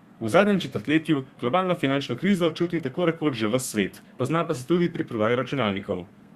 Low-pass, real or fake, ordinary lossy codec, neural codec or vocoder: 14.4 kHz; fake; Opus, 64 kbps; codec, 32 kHz, 1.9 kbps, SNAC